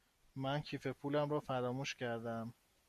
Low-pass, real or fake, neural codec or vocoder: 14.4 kHz; real; none